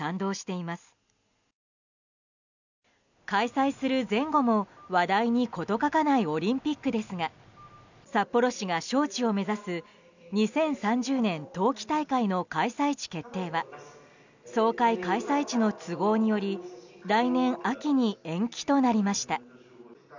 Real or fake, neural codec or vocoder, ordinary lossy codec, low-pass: real; none; none; 7.2 kHz